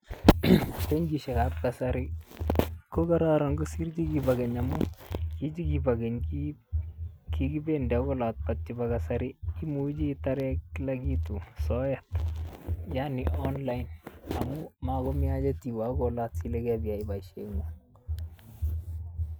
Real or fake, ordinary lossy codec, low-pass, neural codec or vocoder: real; none; none; none